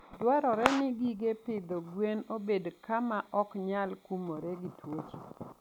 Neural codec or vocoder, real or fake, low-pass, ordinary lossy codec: none; real; 19.8 kHz; none